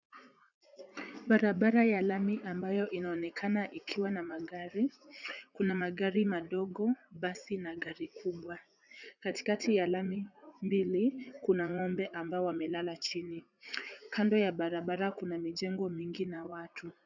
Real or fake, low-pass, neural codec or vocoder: fake; 7.2 kHz; vocoder, 44.1 kHz, 80 mel bands, Vocos